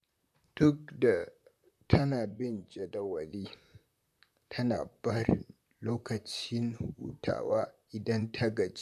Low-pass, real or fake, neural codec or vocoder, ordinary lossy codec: 14.4 kHz; fake; vocoder, 44.1 kHz, 128 mel bands, Pupu-Vocoder; none